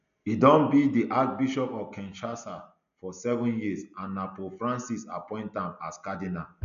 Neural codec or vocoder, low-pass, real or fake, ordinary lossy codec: none; 7.2 kHz; real; none